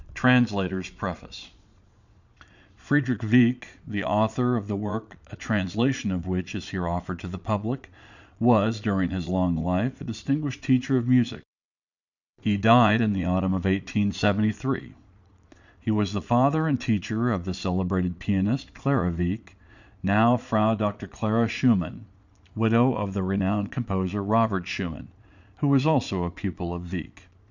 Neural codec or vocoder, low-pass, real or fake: vocoder, 22.05 kHz, 80 mel bands, Vocos; 7.2 kHz; fake